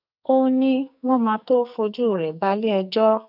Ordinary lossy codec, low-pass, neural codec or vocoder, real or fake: none; 5.4 kHz; codec, 44.1 kHz, 2.6 kbps, SNAC; fake